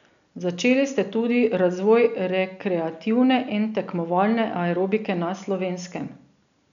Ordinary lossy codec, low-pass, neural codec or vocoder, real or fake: none; 7.2 kHz; none; real